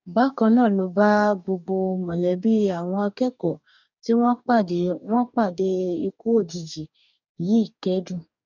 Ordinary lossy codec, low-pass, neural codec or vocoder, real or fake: none; 7.2 kHz; codec, 44.1 kHz, 2.6 kbps, DAC; fake